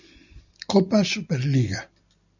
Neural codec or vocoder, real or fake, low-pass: none; real; 7.2 kHz